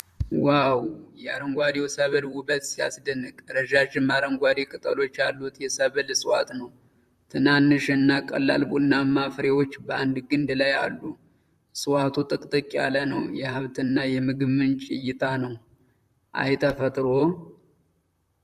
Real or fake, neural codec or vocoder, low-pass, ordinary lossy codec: fake; vocoder, 44.1 kHz, 128 mel bands, Pupu-Vocoder; 14.4 kHz; Opus, 64 kbps